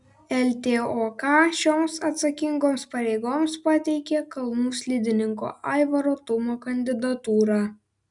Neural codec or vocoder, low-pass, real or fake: none; 10.8 kHz; real